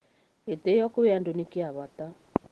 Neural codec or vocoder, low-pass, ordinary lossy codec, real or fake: none; 10.8 kHz; Opus, 16 kbps; real